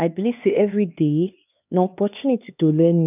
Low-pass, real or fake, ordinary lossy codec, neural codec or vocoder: 3.6 kHz; fake; none; codec, 16 kHz, 2 kbps, X-Codec, HuBERT features, trained on LibriSpeech